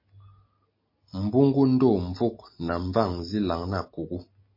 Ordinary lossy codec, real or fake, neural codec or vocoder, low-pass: MP3, 24 kbps; real; none; 5.4 kHz